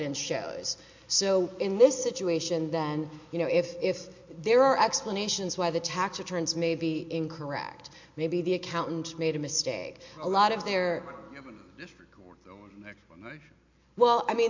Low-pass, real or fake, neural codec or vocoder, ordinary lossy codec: 7.2 kHz; real; none; MP3, 48 kbps